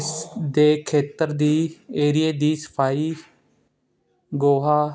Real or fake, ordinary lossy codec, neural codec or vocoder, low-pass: real; none; none; none